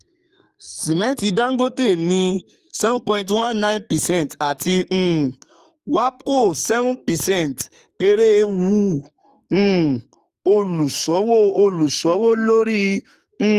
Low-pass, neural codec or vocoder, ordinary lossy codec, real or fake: 14.4 kHz; codec, 44.1 kHz, 2.6 kbps, SNAC; Opus, 32 kbps; fake